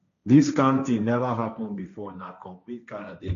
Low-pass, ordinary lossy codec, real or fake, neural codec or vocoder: 7.2 kHz; none; fake; codec, 16 kHz, 1.1 kbps, Voila-Tokenizer